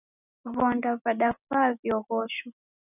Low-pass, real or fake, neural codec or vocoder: 3.6 kHz; real; none